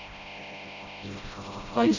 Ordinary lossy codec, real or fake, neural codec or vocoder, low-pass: none; fake; codec, 16 kHz, 0.5 kbps, FreqCodec, smaller model; 7.2 kHz